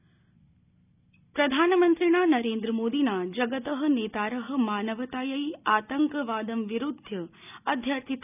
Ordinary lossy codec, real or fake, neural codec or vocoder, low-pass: none; real; none; 3.6 kHz